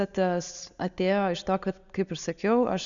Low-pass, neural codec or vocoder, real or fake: 7.2 kHz; none; real